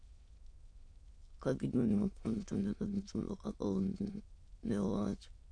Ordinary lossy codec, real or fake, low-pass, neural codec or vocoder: AAC, 48 kbps; fake; 9.9 kHz; autoencoder, 22.05 kHz, a latent of 192 numbers a frame, VITS, trained on many speakers